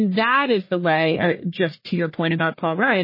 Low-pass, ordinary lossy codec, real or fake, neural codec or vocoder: 5.4 kHz; MP3, 24 kbps; fake; codec, 44.1 kHz, 1.7 kbps, Pupu-Codec